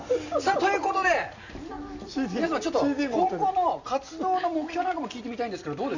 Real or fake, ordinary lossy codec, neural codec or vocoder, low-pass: real; Opus, 64 kbps; none; 7.2 kHz